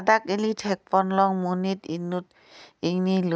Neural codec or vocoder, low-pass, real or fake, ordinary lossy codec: none; none; real; none